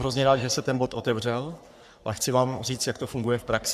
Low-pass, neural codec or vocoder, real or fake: 14.4 kHz; codec, 44.1 kHz, 3.4 kbps, Pupu-Codec; fake